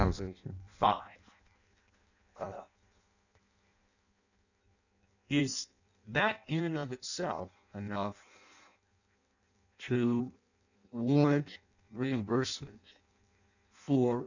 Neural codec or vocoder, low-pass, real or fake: codec, 16 kHz in and 24 kHz out, 0.6 kbps, FireRedTTS-2 codec; 7.2 kHz; fake